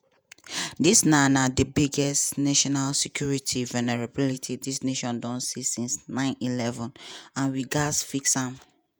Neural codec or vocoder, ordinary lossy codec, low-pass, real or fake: vocoder, 48 kHz, 128 mel bands, Vocos; none; none; fake